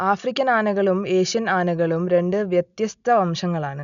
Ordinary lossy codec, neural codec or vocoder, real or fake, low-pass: none; none; real; 7.2 kHz